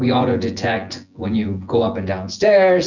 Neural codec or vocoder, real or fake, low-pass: vocoder, 24 kHz, 100 mel bands, Vocos; fake; 7.2 kHz